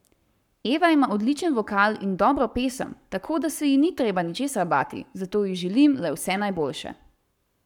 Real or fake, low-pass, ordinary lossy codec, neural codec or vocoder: fake; 19.8 kHz; none; codec, 44.1 kHz, 7.8 kbps, Pupu-Codec